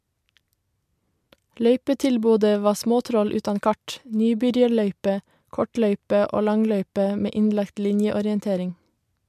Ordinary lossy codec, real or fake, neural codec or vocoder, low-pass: MP3, 96 kbps; real; none; 14.4 kHz